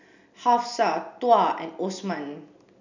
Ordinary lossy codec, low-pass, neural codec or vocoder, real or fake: none; 7.2 kHz; none; real